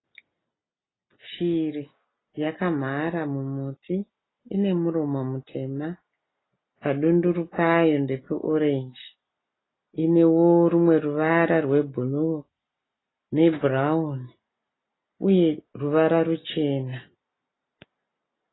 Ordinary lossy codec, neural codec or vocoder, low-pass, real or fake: AAC, 16 kbps; none; 7.2 kHz; real